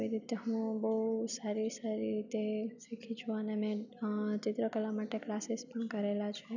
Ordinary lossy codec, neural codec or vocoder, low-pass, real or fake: none; none; 7.2 kHz; real